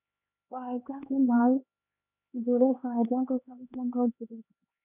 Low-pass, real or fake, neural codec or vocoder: 3.6 kHz; fake; codec, 16 kHz, 2 kbps, X-Codec, HuBERT features, trained on LibriSpeech